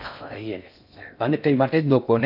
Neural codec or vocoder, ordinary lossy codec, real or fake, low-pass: codec, 16 kHz in and 24 kHz out, 0.6 kbps, FocalCodec, streaming, 4096 codes; none; fake; 5.4 kHz